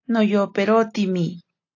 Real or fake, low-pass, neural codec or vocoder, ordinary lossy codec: real; 7.2 kHz; none; AAC, 48 kbps